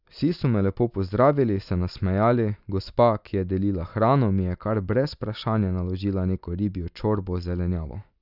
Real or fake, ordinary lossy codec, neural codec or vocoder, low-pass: real; none; none; 5.4 kHz